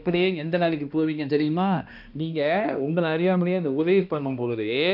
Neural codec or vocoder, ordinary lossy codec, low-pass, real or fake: codec, 16 kHz, 1 kbps, X-Codec, HuBERT features, trained on balanced general audio; none; 5.4 kHz; fake